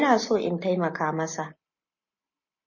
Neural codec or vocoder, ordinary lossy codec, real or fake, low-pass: none; MP3, 32 kbps; real; 7.2 kHz